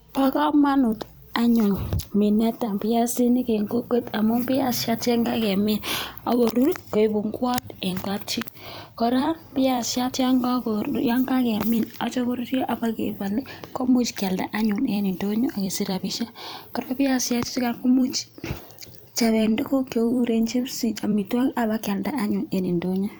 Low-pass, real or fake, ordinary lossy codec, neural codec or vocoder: none; fake; none; vocoder, 44.1 kHz, 128 mel bands every 256 samples, BigVGAN v2